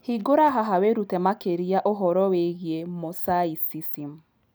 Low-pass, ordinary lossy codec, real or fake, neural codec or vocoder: none; none; real; none